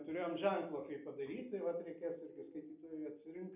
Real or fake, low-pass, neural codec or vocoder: real; 3.6 kHz; none